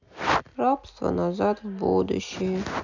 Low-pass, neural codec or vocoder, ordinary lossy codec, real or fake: 7.2 kHz; none; none; real